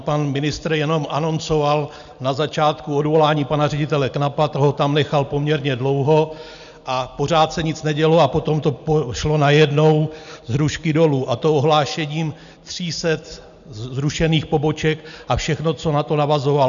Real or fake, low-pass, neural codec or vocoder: real; 7.2 kHz; none